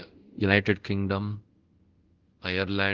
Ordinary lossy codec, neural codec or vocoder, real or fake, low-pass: Opus, 16 kbps; codec, 24 kHz, 0.5 kbps, DualCodec; fake; 7.2 kHz